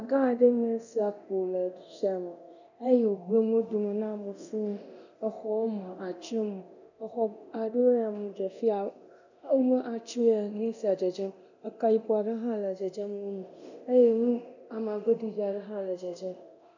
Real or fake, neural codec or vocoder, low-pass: fake; codec, 24 kHz, 0.9 kbps, DualCodec; 7.2 kHz